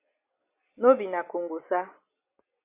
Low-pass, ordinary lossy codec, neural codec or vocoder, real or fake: 3.6 kHz; MP3, 24 kbps; none; real